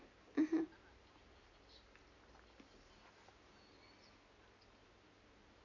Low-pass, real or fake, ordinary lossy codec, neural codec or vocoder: 7.2 kHz; real; none; none